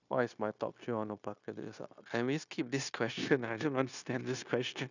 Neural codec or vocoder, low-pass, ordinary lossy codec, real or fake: codec, 16 kHz, 0.9 kbps, LongCat-Audio-Codec; 7.2 kHz; none; fake